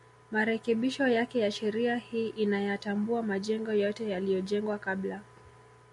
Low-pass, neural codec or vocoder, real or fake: 10.8 kHz; none; real